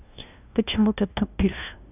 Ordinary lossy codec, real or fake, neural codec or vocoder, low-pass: none; fake; codec, 16 kHz, 0.5 kbps, FunCodec, trained on LibriTTS, 25 frames a second; 3.6 kHz